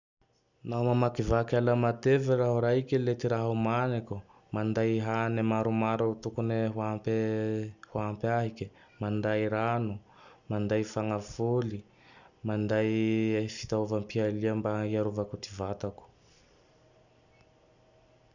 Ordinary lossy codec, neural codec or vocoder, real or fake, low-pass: none; none; real; 7.2 kHz